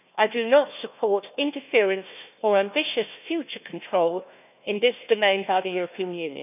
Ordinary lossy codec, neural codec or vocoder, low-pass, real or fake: none; codec, 16 kHz, 1 kbps, FunCodec, trained on Chinese and English, 50 frames a second; 3.6 kHz; fake